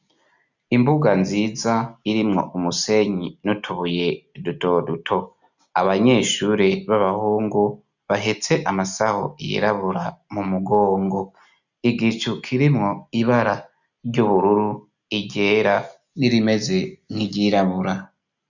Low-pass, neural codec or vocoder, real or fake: 7.2 kHz; none; real